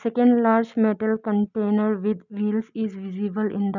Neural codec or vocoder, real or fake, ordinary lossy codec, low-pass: none; real; none; 7.2 kHz